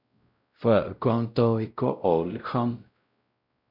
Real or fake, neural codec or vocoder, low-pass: fake; codec, 16 kHz, 0.5 kbps, X-Codec, WavLM features, trained on Multilingual LibriSpeech; 5.4 kHz